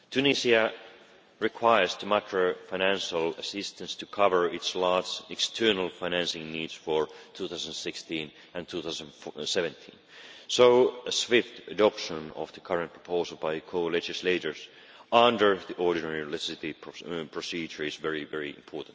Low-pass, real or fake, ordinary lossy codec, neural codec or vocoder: none; real; none; none